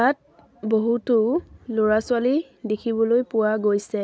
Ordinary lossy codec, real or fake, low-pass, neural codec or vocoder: none; real; none; none